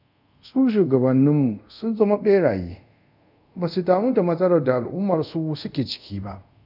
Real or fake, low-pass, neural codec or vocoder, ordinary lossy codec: fake; 5.4 kHz; codec, 24 kHz, 0.5 kbps, DualCodec; none